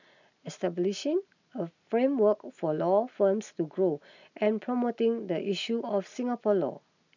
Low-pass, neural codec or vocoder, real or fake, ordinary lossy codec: 7.2 kHz; none; real; none